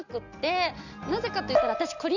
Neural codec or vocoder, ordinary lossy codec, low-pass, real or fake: none; none; 7.2 kHz; real